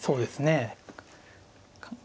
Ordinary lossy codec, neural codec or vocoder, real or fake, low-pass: none; none; real; none